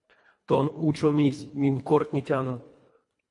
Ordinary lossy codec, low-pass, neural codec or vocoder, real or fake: MP3, 48 kbps; 10.8 kHz; codec, 24 kHz, 1.5 kbps, HILCodec; fake